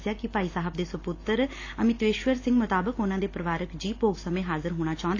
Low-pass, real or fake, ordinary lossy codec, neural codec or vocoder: 7.2 kHz; real; AAC, 32 kbps; none